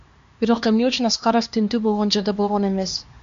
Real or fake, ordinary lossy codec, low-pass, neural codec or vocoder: fake; MP3, 48 kbps; 7.2 kHz; codec, 16 kHz, 2 kbps, X-Codec, HuBERT features, trained on LibriSpeech